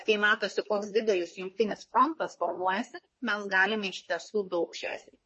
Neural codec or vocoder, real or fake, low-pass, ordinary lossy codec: codec, 24 kHz, 1 kbps, SNAC; fake; 10.8 kHz; MP3, 32 kbps